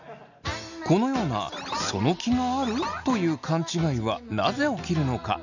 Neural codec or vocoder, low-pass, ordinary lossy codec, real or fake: none; 7.2 kHz; none; real